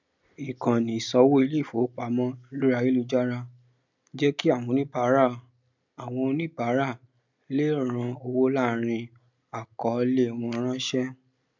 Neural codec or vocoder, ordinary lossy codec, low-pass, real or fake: none; none; 7.2 kHz; real